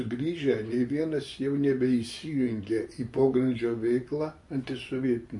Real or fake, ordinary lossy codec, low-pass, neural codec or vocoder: fake; MP3, 48 kbps; 10.8 kHz; vocoder, 24 kHz, 100 mel bands, Vocos